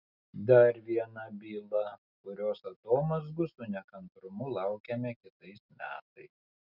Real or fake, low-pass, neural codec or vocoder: real; 5.4 kHz; none